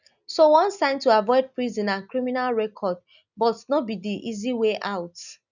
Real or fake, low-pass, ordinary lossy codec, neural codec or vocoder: real; 7.2 kHz; none; none